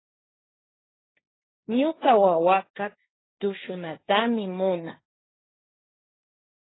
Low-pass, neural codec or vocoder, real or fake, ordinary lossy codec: 7.2 kHz; codec, 16 kHz, 1.1 kbps, Voila-Tokenizer; fake; AAC, 16 kbps